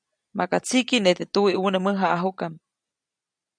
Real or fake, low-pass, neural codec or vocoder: real; 9.9 kHz; none